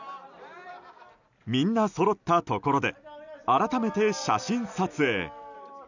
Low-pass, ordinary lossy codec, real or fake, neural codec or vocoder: 7.2 kHz; none; real; none